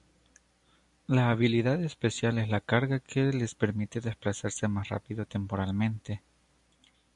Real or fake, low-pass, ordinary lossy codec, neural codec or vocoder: real; 10.8 kHz; MP3, 96 kbps; none